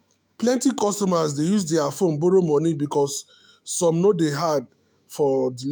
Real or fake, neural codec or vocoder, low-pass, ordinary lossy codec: fake; autoencoder, 48 kHz, 128 numbers a frame, DAC-VAE, trained on Japanese speech; none; none